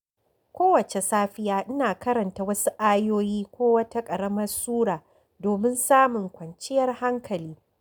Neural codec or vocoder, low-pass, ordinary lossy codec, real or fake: none; none; none; real